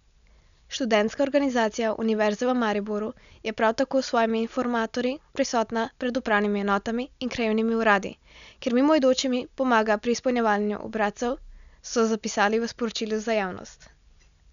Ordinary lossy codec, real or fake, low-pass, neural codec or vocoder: none; real; 7.2 kHz; none